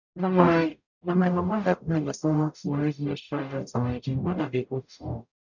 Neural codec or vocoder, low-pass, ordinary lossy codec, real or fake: codec, 44.1 kHz, 0.9 kbps, DAC; 7.2 kHz; none; fake